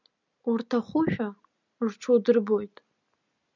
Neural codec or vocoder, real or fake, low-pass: none; real; 7.2 kHz